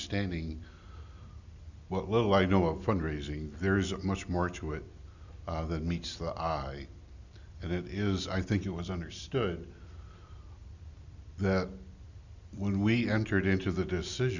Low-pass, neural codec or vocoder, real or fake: 7.2 kHz; none; real